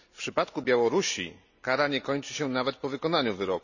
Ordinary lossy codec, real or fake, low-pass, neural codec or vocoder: none; real; 7.2 kHz; none